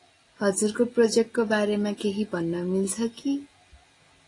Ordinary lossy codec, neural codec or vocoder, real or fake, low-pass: AAC, 32 kbps; none; real; 10.8 kHz